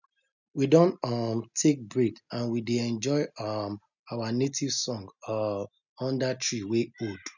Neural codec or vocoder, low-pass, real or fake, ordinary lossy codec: none; 7.2 kHz; real; none